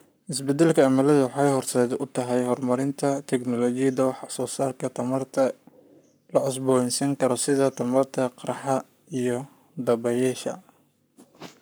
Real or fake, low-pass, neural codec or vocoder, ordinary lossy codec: fake; none; codec, 44.1 kHz, 7.8 kbps, Pupu-Codec; none